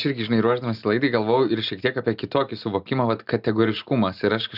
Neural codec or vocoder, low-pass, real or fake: none; 5.4 kHz; real